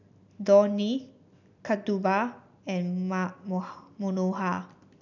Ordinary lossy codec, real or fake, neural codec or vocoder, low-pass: none; real; none; 7.2 kHz